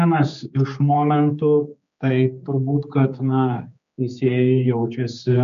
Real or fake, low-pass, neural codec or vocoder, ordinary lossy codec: fake; 7.2 kHz; codec, 16 kHz, 4 kbps, X-Codec, HuBERT features, trained on general audio; MP3, 64 kbps